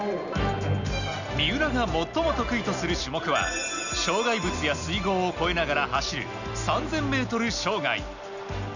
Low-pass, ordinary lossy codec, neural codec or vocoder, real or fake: 7.2 kHz; none; none; real